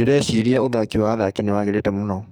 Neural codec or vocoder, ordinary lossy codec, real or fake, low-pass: codec, 44.1 kHz, 2.6 kbps, SNAC; none; fake; none